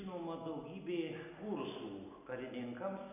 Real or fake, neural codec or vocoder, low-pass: real; none; 3.6 kHz